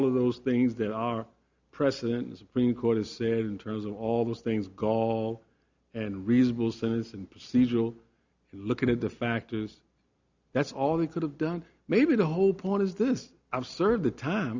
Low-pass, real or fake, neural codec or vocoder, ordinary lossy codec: 7.2 kHz; real; none; Opus, 64 kbps